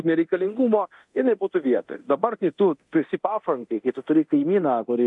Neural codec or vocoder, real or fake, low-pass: codec, 24 kHz, 0.9 kbps, DualCodec; fake; 10.8 kHz